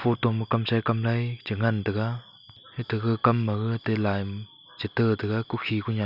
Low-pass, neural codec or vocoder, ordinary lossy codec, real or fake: 5.4 kHz; none; none; real